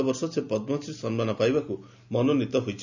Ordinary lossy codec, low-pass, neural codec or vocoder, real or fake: none; 7.2 kHz; none; real